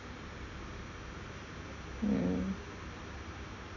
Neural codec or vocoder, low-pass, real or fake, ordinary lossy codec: none; 7.2 kHz; real; none